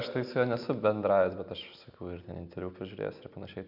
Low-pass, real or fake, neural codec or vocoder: 5.4 kHz; real; none